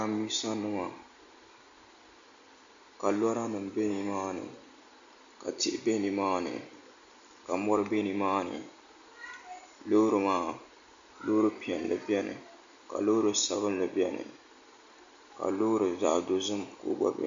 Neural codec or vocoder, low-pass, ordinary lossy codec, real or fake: none; 7.2 kHz; MP3, 64 kbps; real